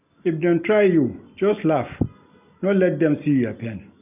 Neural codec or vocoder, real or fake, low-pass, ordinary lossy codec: none; real; 3.6 kHz; none